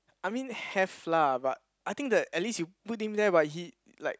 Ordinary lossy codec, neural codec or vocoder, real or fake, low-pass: none; none; real; none